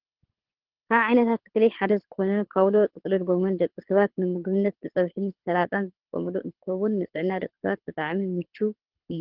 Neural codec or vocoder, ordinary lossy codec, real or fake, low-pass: codec, 24 kHz, 6 kbps, HILCodec; Opus, 16 kbps; fake; 5.4 kHz